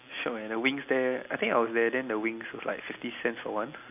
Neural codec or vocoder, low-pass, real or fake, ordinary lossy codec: none; 3.6 kHz; real; AAC, 32 kbps